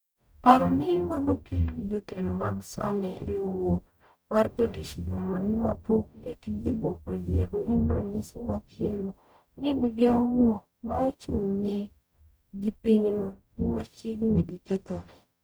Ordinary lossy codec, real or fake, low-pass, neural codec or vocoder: none; fake; none; codec, 44.1 kHz, 0.9 kbps, DAC